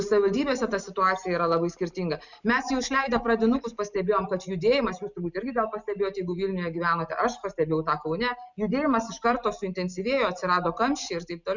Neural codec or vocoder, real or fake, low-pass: none; real; 7.2 kHz